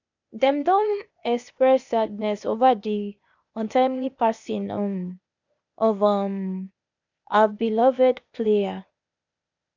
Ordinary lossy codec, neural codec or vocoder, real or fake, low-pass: none; codec, 16 kHz, 0.8 kbps, ZipCodec; fake; 7.2 kHz